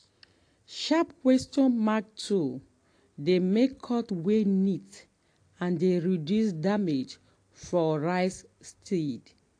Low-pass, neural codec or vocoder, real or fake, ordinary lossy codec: 9.9 kHz; none; real; AAC, 48 kbps